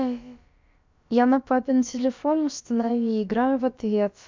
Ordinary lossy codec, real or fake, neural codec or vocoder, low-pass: MP3, 64 kbps; fake; codec, 16 kHz, about 1 kbps, DyCAST, with the encoder's durations; 7.2 kHz